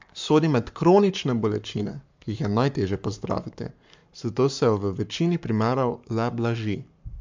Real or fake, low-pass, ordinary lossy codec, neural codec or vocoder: fake; 7.2 kHz; MP3, 64 kbps; codec, 44.1 kHz, 7.8 kbps, Pupu-Codec